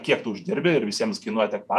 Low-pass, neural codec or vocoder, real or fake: 14.4 kHz; vocoder, 48 kHz, 128 mel bands, Vocos; fake